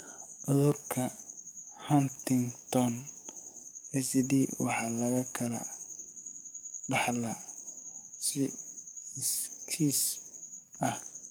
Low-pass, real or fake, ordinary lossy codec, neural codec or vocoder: none; fake; none; codec, 44.1 kHz, 7.8 kbps, DAC